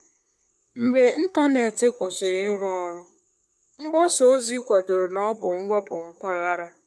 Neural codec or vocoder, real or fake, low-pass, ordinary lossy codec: codec, 24 kHz, 1 kbps, SNAC; fake; none; none